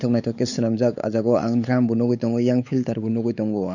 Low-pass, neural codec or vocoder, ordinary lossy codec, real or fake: 7.2 kHz; codec, 16 kHz, 4 kbps, X-Codec, WavLM features, trained on Multilingual LibriSpeech; none; fake